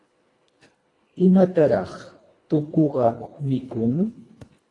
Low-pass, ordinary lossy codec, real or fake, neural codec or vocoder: 10.8 kHz; AAC, 32 kbps; fake; codec, 24 kHz, 1.5 kbps, HILCodec